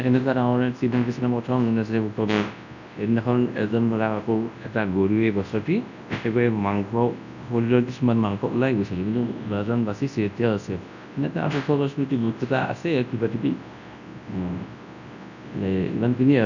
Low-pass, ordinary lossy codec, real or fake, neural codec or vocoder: 7.2 kHz; none; fake; codec, 24 kHz, 0.9 kbps, WavTokenizer, large speech release